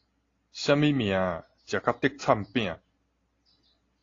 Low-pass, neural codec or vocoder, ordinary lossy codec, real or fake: 7.2 kHz; none; AAC, 32 kbps; real